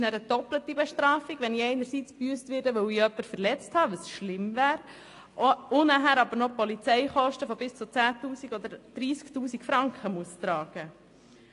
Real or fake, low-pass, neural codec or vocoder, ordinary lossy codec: real; 10.8 kHz; none; AAC, 48 kbps